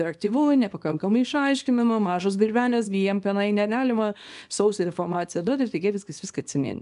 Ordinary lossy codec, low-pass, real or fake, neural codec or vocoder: MP3, 96 kbps; 10.8 kHz; fake; codec, 24 kHz, 0.9 kbps, WavTokenizer, small release